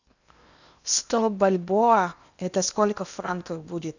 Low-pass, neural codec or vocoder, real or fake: 7.2 kHz; codec, 16 kHz in and 24 kHz out, 0.8 kbps, FocalCodec, streaming, 65536 codes; fake